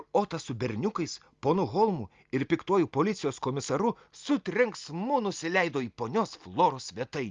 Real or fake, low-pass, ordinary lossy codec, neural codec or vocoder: real; 7.2 kHz; Opus, 32 kbps; none